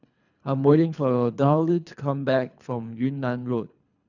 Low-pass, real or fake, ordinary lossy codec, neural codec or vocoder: 7.2 kHz; fake; none; codec, 24 kHz, 3 kbps, HILCodec